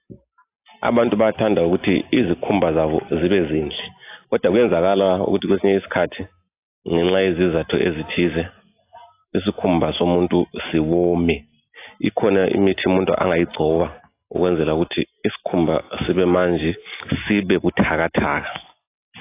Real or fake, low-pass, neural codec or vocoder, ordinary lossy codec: real; 3.6 kHz; none; AAC, 24 kbps